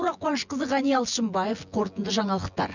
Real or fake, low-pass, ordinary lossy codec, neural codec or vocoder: fake; 7.2 kHz; none; vocoder, 24 kHz, 100 mel bands, Vocos